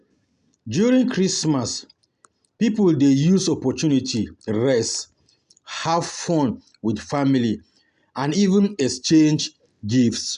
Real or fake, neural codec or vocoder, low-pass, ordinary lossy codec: real; none; 10.8 kHz; none